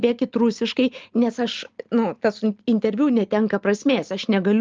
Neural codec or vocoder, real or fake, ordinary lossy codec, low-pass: none; real; Opus, 24 kbps; 7.2 kHz